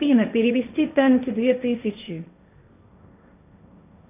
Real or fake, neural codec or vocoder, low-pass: fake; codec, 16 kHz, 1.1 kbps, Voila-Tokenizer; 3.6 kHz